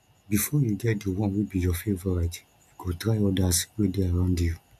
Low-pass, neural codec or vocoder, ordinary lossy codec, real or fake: 14.4 kHz; none; none; real